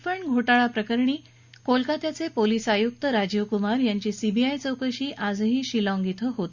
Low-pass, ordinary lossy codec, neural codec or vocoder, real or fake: 7.2 kHz; Opus, 64 kbps; none; real